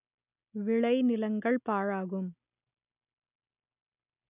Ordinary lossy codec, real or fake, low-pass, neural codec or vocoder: none; real; 3.6 kHz; none